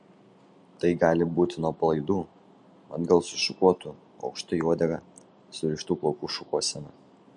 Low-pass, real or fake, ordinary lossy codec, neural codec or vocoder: 10.8 kHz; real; MP3, 48 kbps; none